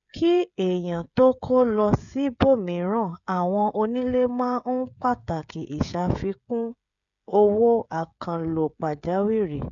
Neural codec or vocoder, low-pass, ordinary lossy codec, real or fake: codec, 16 kHz, 16 kbps, FreqCodec, smaller model; 7.2 kHz; none; fake